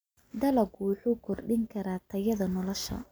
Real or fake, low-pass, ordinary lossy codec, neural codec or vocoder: real; none; none; none